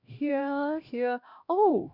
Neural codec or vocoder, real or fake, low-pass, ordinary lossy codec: codec, 16 kHz, 1 kbps, X-Codec, HuBERT features, trained on LibriSpeech; fake; 5.4 kHz; none